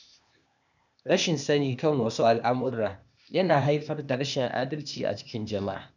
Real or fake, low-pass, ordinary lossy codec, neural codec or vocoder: fake; 7.2 kHz; none; codec, 16 kHz, 0.8 kbps, ZipCodec